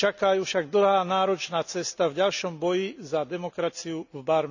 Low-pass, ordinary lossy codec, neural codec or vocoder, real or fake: 7.2 kHz; none; none; real